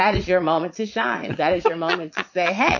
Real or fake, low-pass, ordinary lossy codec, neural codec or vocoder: fake; 7.2 kHz; MP3, 48 kbps; vocoder, 22.05 kHz, 80 mel bands, Vocos